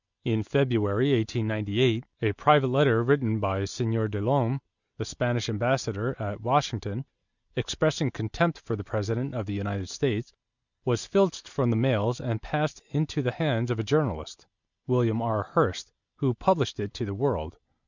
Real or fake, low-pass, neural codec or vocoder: real; 7.2 kHz; none